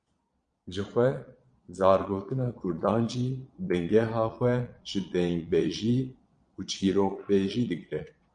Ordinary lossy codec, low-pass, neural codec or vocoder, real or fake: MP3, 64 kbps; 9.9 kHz; vocoder, 22.05 kHz, 80 mel bands, Vocos; fake